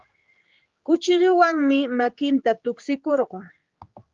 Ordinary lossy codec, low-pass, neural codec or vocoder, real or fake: Opus, 32 kbps; 7.2 kHz; codec, 16 kHz, 4 kbps, X-Codec, HuBERT features, trained on general audio; fake